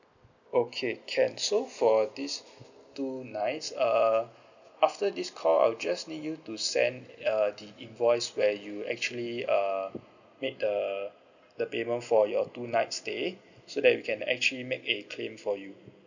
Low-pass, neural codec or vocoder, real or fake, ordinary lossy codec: 7.2 kHz; none; real; none